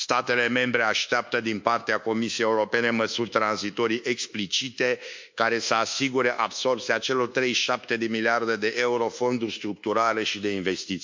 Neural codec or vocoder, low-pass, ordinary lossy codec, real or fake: codec, 24 kHz, 1.2 kbps, DualCodec; 7.2 kHz; MP3, 64 kbps; fake